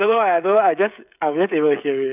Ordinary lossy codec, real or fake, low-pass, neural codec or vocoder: none; fake; 3.6 kHz; codec, 16 kHz, 16 kbps, FreqCodec, smaller model